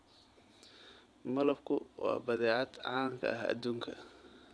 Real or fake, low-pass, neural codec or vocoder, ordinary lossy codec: fake; none; vocoder, 22.05 kHz, 80 mel bands, Vocos; none